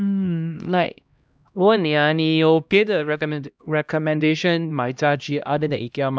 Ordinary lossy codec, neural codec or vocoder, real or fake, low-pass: none; codec, 16 kHz, 1 kbps, X-Codec, HuBERT features, trained on LibriSpeech; fake; none